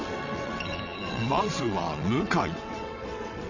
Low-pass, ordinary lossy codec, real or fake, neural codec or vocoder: 7.2 kHz; none; fake; vocoder, 22.05 kHz, 80 mel bands, WaveNeXt